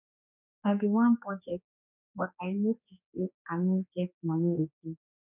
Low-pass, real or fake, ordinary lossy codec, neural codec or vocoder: 3.6 kHz; fake; none; codec, 16 kHz, 4 kbps, X-Codec, HuBERT features, trained on general audio